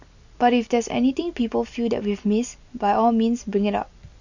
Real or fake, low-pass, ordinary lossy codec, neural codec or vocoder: real; 7.2 kHz; none; none